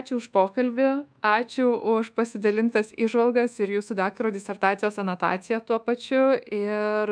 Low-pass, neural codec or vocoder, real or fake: 9.9 kHz; codec, 24 kHz, 1.2 kbps, DualCodec; fake